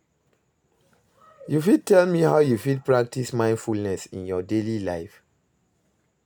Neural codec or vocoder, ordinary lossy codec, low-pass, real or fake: none; none; none; real